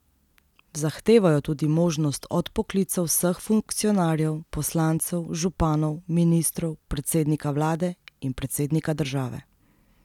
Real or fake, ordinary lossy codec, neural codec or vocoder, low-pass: real; none; none; 19.8 kHz